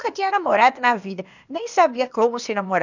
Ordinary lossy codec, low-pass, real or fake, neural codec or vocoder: none; 7.2 kHz; fake; codec, 16 kHz, 0.8 kbps, ZipCodec